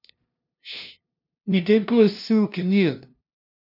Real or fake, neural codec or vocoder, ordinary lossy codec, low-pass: fake; codec, 16 kHz, 0.5 kbps, FunCodec, trained on LibriTTS, 25 frames a second; none; 5.4 kHz